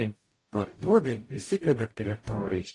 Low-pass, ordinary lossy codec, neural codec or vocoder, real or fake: 10.8 kHz; AAC, 48 kbps; codec, 44.1 kHz, 0.9 kbps, DAC; fake